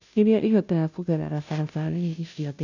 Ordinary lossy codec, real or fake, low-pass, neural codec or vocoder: none; fake; 7.2 kHz; codec, 16 kHz, 0.5 kbps, FunCodec, trained on Chinese and English, 25 frames a second